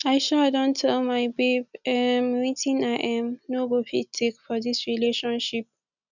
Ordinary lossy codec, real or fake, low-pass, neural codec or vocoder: none; real; 7.2 kHz; none